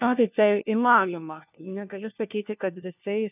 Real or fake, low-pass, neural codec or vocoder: fake; 3.6 kHz; codec, 16 kHz, 1 kbps, FunCodec, trained on LibriTTS, 50 frames a second